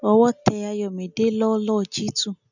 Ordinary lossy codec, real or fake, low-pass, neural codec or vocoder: none; real; 7.2 kHz; none